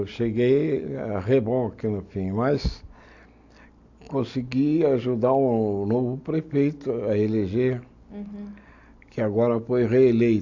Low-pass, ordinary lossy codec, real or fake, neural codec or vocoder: 7.2 kHz; AAC, 48 kbps; real; none